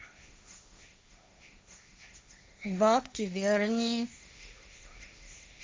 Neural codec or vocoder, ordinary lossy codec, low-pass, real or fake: codec, 16 kHz, 1.1 kbps, Voila-Tokenizer; none; none; fake